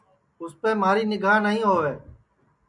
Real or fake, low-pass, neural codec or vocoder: real; 10.8 kHz; none